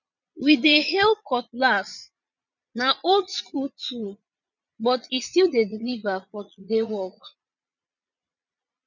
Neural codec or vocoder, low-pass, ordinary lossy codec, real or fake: vocoder, 22.05 kHz, 80 mel bands, Vocos; 7.2 kHz; none; fake